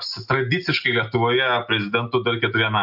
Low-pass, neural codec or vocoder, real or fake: 5.4 kHz; none; real